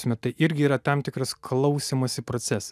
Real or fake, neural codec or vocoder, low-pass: real; none; 14.4 kHz